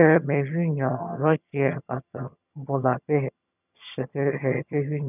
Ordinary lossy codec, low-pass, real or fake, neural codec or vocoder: none; 3.6 kHz; fake; vocoder, 22.05 kHz, 80 mel bands, HiFi-GAN